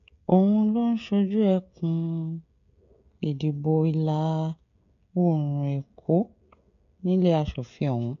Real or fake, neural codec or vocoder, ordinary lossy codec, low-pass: fake; codec, 16 kHz, 16 kbps, FreqCodec, smaller model; MP3, 64 kbps; 7.2 kHz